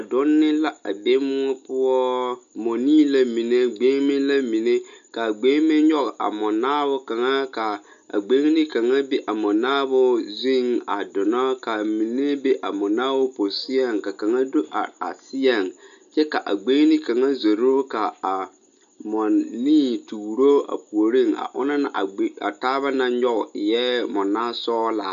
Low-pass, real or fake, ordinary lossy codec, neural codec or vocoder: 7.2 kHz; real; MP3, 96 kbps; none